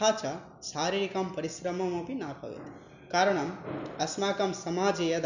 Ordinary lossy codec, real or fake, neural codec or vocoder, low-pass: none; real; none; 7.2 kHz